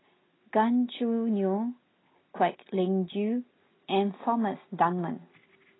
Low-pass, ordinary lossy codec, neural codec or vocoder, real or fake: 7.2 kHz; AAC, 16 kbps; none; real